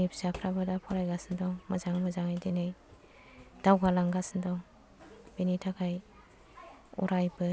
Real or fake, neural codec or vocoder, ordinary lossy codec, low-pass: real; none; none; none